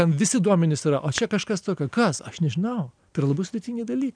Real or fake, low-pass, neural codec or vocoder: real; 9.9 kHz; none